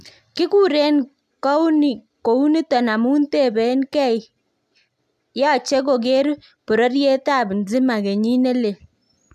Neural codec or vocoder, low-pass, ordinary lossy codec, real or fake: none; 14.4 kHz; AAC, 96 kbps; real